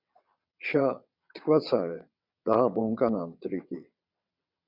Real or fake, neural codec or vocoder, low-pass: fake; vocoder, 22.05 kHz, 80 mel bands, WaveNeXt; 5.4 kHz